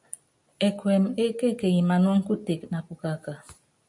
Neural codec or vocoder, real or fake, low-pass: none; real; 10.8 kHz